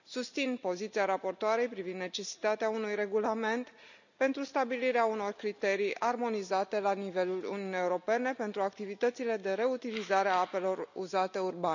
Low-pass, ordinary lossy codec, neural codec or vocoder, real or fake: 7.2 kHz; none; none; real